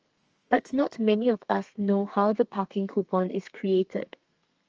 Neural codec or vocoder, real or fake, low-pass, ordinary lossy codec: codec, 32 kHz, 1.9 kbps, SNAC; fake; 7.2 kHz; Opus, 24 kbps